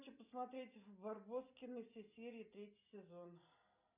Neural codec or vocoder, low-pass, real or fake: none; 3.6 kHz; real